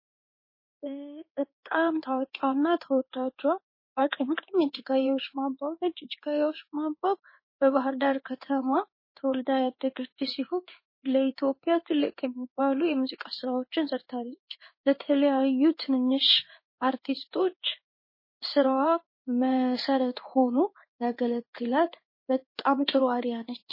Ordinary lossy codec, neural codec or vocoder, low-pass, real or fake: MP3, 24 kbps; codec, 16 kHz in and 24 kHz out, 1 kbps, XY-Tokenizer; 5.4 kHz; fake